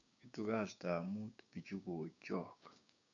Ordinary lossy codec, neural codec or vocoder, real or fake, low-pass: none; codec, 16 kHz, 6 kbps, DAC; fake; 7.2 kHz